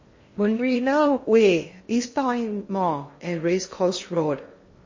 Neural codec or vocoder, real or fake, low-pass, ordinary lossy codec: codec, 16 kHz in and 24 kHz out, 0.6 kbps, FocalCodec, streaming, 2048 codes; fake; 7.2 kHz; MP3, 32 kbps